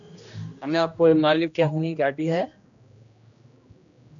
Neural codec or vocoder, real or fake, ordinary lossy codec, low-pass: codec, 16 kHz, 1 kbps, X-Codec, HuBERT features, trained on general audio; fake; MP3, 96 kbps; 7.2 kHz